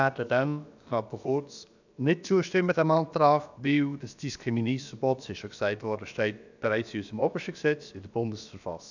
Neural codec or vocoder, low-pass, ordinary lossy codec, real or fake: codec, 16 kHz, about 1 kbps, DyCAST, with the encoder's durations; 7.2 kHz; none; fake